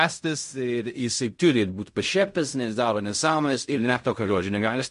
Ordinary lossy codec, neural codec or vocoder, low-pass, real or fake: MP3, 48 kbps; codec, 16 kHz in and 24 kHz out, 0.4 kbps, LongCat-Audio-Codec, fine tuned four codebook decoder; 10.8 kHz; fake